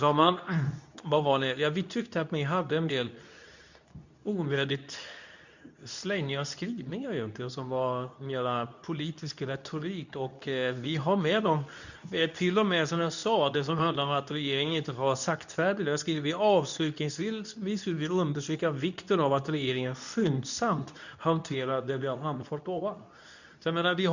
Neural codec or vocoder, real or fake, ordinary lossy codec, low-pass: codec, 24 kHz, 0.9 kbps, WavTokenizer, medium speech release version 2; fake; none; 7.2 kHz